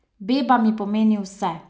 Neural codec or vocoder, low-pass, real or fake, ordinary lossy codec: none; none; real; none